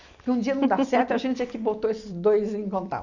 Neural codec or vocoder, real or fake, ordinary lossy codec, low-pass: none; real; none; 7.2 kHz